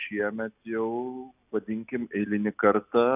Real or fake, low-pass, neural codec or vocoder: real; 3.6 kHz; none